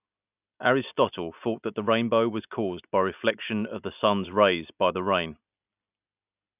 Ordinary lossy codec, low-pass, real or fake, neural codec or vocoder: none; 3.6 kHz; real; none